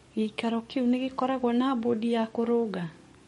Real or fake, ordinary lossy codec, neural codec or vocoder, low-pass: fake; MP3, 48 kbps; codec, 44.1 kHz, 7.8 kbps, DAC; 19.8 kHz